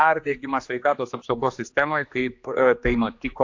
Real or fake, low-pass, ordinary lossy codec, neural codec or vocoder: fake; 7.2 kHz; AAC, 48 kbps; codec, 16 kHz, 2 kbps, X-Codec, HuBERT features, trained on general audio